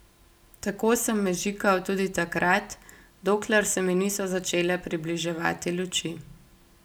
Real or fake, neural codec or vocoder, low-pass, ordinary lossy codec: real; none; none; none